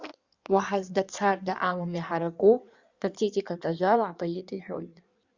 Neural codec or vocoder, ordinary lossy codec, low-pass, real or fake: codec, 16 kHz in and 24 kHz out, 1.1 kbps, FireRedTTS-2 codec; Opus, 64 kbps; 7.2 kHz; fake